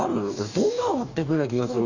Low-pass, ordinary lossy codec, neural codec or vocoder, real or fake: 7.2 kHz; none; codec, 44.1 kHz, 2.6 kbps, DAC; fake